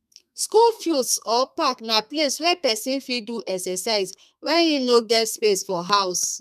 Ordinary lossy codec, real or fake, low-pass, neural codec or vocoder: none; fake; 14.4 kHz; codec, 32 kHz, 1.9 kbps, SNAC